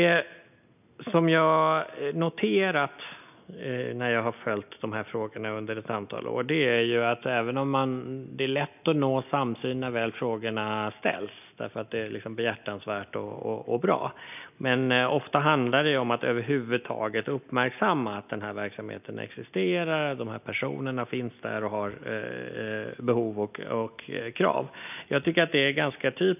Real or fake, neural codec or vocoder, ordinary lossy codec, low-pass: real; none; none; 3.6 kHz